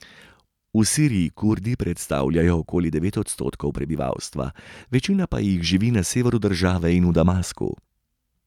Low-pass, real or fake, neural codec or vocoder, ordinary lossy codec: 19.8 kHz; real; none; none